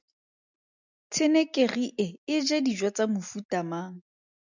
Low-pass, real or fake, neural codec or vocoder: 7.2 kHz; real; none